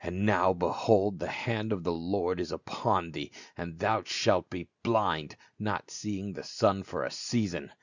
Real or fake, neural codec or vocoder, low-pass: real; none; 7.2 kHz